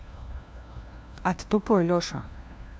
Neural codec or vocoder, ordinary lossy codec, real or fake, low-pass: codec, 16 kHz, 1 kbps, FunCodec, trained on LibriTTS, 50 frames a second; none; fake; none